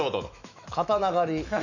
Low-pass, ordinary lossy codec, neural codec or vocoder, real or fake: 7.2 kHz; none; autoencoder, 48 kHz, 128 numbers a frame, DAC-VAE, trained on Japanese speech; fake